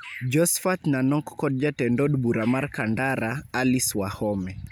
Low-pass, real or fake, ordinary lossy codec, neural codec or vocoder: none; real; none; none